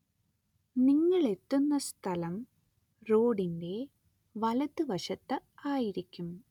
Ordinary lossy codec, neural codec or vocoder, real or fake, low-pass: none; none; real; 19.8 kHz